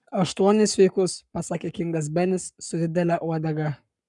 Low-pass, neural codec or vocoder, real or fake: 10.8 kHz; codec, 44.1 kHz, 7.8 kbps, Pupu-Codec; fake